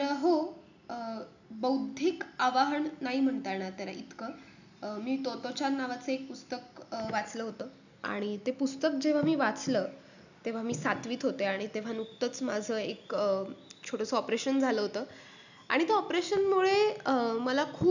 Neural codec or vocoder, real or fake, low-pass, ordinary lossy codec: none; real; 7.2 kHz; none